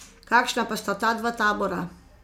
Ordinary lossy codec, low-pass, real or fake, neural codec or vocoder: MP3, 96 kbps; 19.8 kHz; real; none